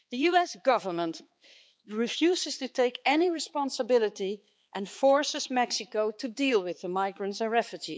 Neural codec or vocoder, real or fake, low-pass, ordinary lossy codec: codec, 16 kHz, 4 kbps, X-Codec, HuBERT features, trained on balanced general audio; fake; none; none